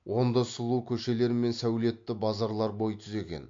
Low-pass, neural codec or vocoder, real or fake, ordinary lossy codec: 7.2 kHz; none; real; MP3, 48 kbps